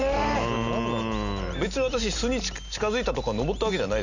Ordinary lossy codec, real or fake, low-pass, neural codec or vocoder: none; real; 7.2 kHz; none